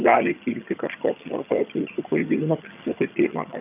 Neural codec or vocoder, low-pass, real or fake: vocoder, 22.05 kHz, 80 mel bands, HiFi-GAN; 3.6 kHz; fake